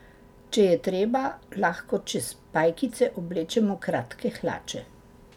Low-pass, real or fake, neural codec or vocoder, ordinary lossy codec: 19.8 kHz; real; none; none